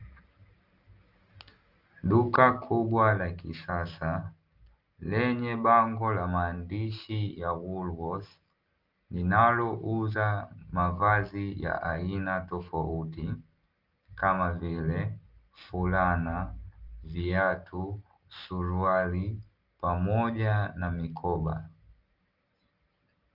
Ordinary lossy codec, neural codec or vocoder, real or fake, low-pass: Opus, 24 kbps; none; real; 5.4 kHz